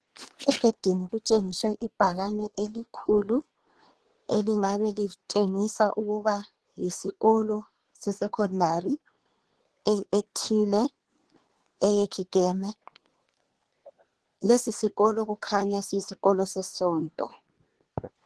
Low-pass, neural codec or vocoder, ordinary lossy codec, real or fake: 10.8 kHz; codec, 24 kHz, 1 kbps, SNAC; Opus, 16 kbps; fake